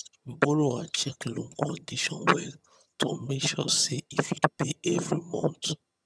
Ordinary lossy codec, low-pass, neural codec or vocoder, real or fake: none; none; vocoder, 22.05 kHz, 80 mel bands, HiFi-GAN; fake